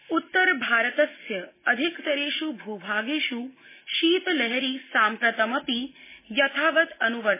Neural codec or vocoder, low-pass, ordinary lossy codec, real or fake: none; 3.6 kHz; MP3, 16 kbps; real